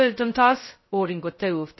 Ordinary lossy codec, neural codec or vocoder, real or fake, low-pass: MP3, 24 kbps; codec, 16 kHz, 0.2 kbps, FocalCodec; fake; 7.2 kHz